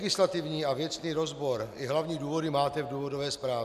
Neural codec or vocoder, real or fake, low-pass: none; real; 14.4 kHz